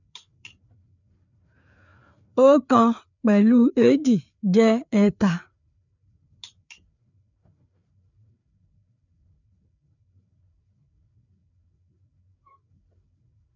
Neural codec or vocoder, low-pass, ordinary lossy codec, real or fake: codec, 16 kHz, 4 kbps, FreqCodec, larger model; 7.2 kHz; none; fake